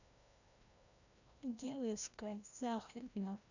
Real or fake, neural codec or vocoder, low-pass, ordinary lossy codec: fake; codec, 16 kHz, 1 kbps, FreqCodec, larger model; 7.2 kHz; none